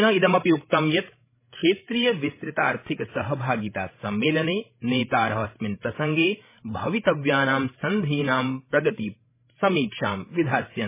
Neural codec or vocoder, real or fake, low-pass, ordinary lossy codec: codec, 16 kHz, 16 kbps, FreqCodec, larger model; fake; 3.6 kHz; MP3, 16 kbps